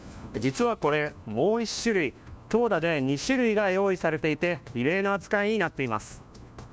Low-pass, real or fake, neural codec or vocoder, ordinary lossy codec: none; fake; codec, 16 kHz, 1 kbps, FunCodec, trained on LibriTTS, 50 frames a second; none